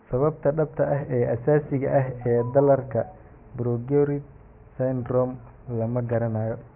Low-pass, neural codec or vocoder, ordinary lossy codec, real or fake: 3.6 kHz; vocoder, 44.1 kHz, 128 mel bands every 512 samples, BigVGAN v2; none; fake